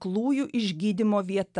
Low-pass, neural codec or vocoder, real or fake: 10.8 kHz; none; real